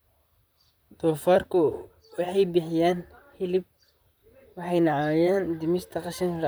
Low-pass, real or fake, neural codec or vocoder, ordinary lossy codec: none; fake; vocoder, 44.1 kHz, 128 mel bands, Pupu-Vocoder; none